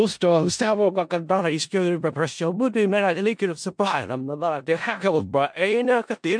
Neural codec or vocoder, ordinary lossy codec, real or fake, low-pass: codec, 16 kHz in and 24 kHz out, 0.4 kbps, LongCat-Audio-Codec, four codebook decoder; MP3, 64 kbps; fake; 9.9 kHz